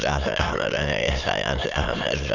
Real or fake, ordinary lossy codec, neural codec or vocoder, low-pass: fake; none; autoencoder, 22.05 kHz, a latent of 192 numbers a frame, VITS, trained on many speakers; 7.2 kHz